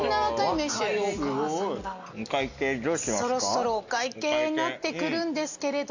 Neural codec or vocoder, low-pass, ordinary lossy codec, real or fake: none; 7.2 kHz; none; real